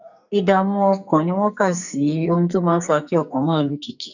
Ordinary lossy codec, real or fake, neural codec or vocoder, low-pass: none; fake; codec, 44.1 kHz, 2.6 kbps, SNAC; 7.2 kHz